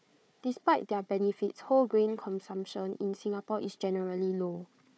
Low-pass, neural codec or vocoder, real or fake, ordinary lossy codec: none; codec, 16 kHz, 4 kbps, FunCodec, trained on Chinese and English, 50 frames a second; fake; none